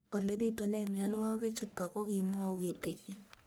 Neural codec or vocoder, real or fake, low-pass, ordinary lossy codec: codec, 44.1 kHz, 1.7 kbps, Pupu-Codec; fake; none; none